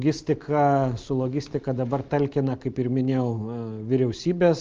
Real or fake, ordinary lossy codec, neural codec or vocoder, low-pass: real; Opus, 24 kbps; none; 7.2 kHz